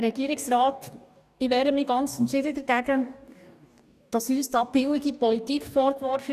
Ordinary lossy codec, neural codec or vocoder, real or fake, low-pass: none; codec, 44.1 kHz, 2.6 kbps, DAC; fake; 14.4 kHz